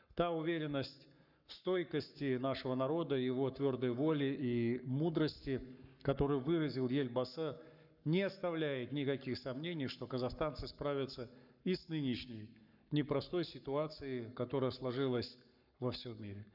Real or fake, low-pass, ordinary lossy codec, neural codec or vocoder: fake; 5.4 kHz; none; codec, 44.1 kHz, 7.8 kbps, Pupu-Codec